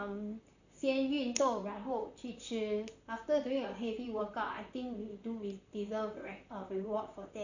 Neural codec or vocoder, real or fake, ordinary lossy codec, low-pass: vocoder, 44.1 kHz, 128 mel bands, Pupu-Vocoder; fake; none; 7.2 kHz